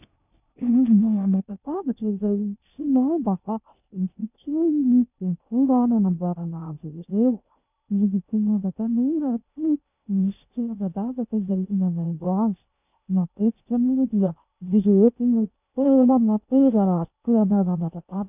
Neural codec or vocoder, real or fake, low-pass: codec, 16 kHz in and 24 kHz out, 0.6 kbps, FocalCodec, streaming, 2048 codes; fake; 3.6 kHz